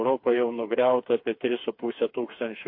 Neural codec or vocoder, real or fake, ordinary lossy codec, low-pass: codec, 16 kHz, 4 kbps, FreqCodec, smaller model; fake; MP3, 32 kbps; 5.4 kHz